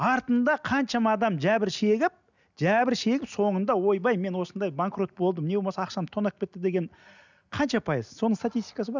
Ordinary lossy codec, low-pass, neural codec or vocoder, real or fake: none; 7.2 kHz; none; real